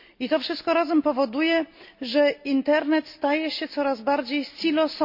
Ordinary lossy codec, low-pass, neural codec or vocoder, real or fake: MP3, 32 kbps; 5.4 kHz; none; real